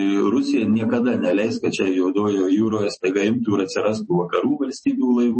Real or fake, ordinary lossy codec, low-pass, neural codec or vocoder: fake; MP3, 32 kbps; 10.8 kHz; vocoder, 44.1 kHz, 128 mel bands every 256 samples, BigVGAN v2